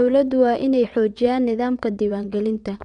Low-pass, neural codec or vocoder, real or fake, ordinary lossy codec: 9.9 kHz; vocoder, 22.05 kHz, 80 mel bands, WaveNeXt; fake; none